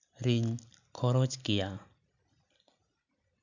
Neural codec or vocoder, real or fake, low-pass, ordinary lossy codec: none; real; 7.2 kHz; none